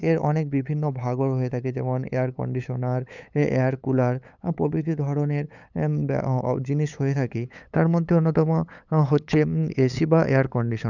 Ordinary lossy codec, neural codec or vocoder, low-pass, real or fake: none; codec, 16 kHz, 8 kbps, FunCodec, trained on LibriTTS, 25 frames a second; 7.2 kHz; fake